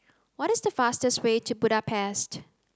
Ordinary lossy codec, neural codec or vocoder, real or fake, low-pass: none; none; real; none